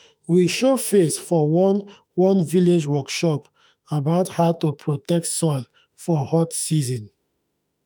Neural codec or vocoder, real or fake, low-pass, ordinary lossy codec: autoencoder, 48 kHz, 32 numbers a frame, DAC-VAE, trained on Japanese speech; fake; none; none